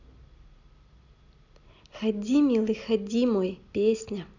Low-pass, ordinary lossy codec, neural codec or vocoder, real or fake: 7.2 kHz; none; none; real